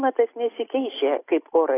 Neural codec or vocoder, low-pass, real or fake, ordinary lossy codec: none; 3.6 kHz; real; AAC, 16 kbps